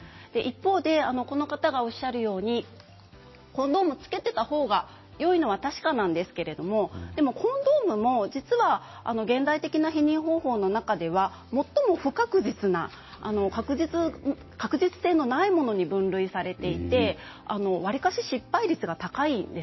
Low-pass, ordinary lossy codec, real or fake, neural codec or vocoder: 7.2 kHz; MP3, 24 kbps; real; none